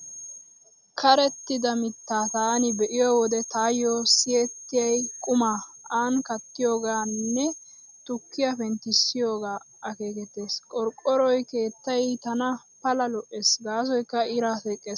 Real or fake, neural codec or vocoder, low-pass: real; none; 7.2 kHz